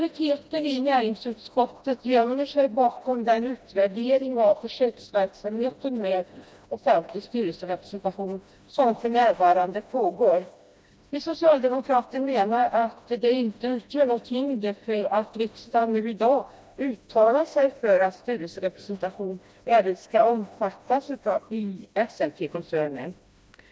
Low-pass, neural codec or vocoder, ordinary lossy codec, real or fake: none; codec, 16 kHz, 1 kbps, FreqCodec, smaller model; none; fake